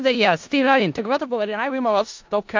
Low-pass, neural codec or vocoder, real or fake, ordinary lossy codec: 7.2 kHz; codec, 16 kHz in and 24 kHz out, 0.4 kbps, LongCat-Audio-Codec, four codebook decoder; fake; MP3, 48 kbps